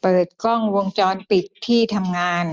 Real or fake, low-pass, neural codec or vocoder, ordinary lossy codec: real; none; none; none